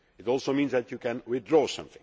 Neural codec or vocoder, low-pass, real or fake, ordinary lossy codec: none; none; real; none